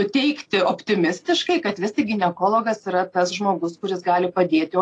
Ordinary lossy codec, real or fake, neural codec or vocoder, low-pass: AAC, 48 kbps; real; none; 10.8 kHz